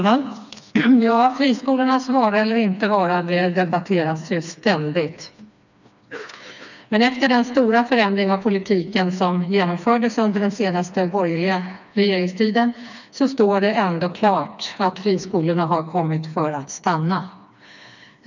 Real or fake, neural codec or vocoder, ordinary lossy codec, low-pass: fake; codec, 16 kHz, 2 kbps, FreqCodec, smaller model; none; 7.2 kHz